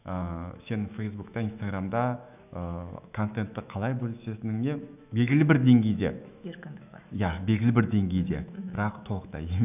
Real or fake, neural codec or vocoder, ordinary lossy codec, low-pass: fake; autoencoder, 48 kHz, 128 numbers a frame, DAC-VAE, trained on Japanese speech; none; 3.6 kHz